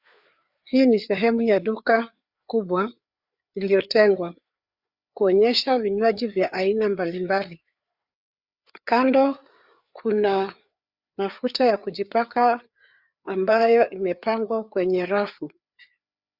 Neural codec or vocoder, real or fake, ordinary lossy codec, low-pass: codec, 16 kHz, 4 kbps, FreqCodec, larger model; fake; Opus, 64 kbps; 5.4 kHz